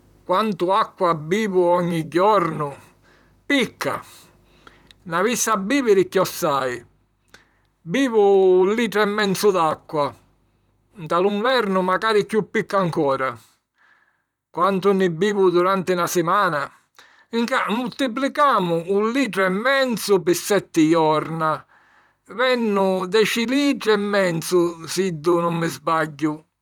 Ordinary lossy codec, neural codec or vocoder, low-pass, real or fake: none; vocoder, 44.1 kHz, 128 mel bands, Pupu-Vocoder; 19.8 kHz; fake